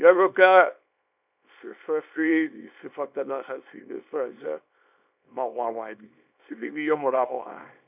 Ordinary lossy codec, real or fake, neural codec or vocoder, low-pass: none; fake; codec, 24 kHz, 0.9 kbps, WavTokenizer, small release; 3.6 kHz